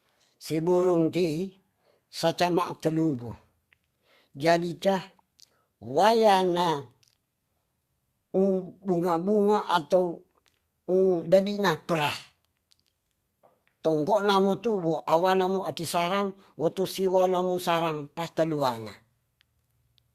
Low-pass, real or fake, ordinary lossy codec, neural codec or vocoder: 14.4 kHz; fake; Opus, 64 kbps; codec, 32 kHz, 1.9 kbps, SNAC